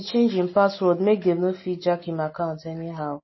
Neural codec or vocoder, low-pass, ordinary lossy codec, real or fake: none; 7.2 kHz; MP3, 24 kbps; real